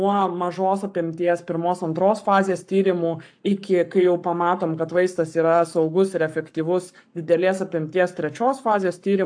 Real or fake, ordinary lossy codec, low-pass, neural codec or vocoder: fake; AAC, 64 kbps; 9.9 kHz; codec, 44.1 kHz, 7.8 kbps, Pupu-Codec